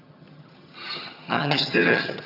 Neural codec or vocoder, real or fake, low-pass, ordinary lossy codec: vocoder, 22.05 kHz, 80 mel bands, HiFi-GAN; fake; 5.4 kHz; none